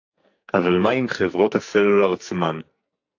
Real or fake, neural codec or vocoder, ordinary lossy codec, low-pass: fake; codec, 44.1 kHz, 2.6 kbps, SNAC; AAC, 48 kbps; 7.2 kHz